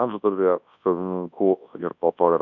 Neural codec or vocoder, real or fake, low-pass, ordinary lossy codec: codec, 24 kHz, 0.9 kbps, WavTokenizer, large speech release; fake; 7.2 kHz; MP3, 64 kbps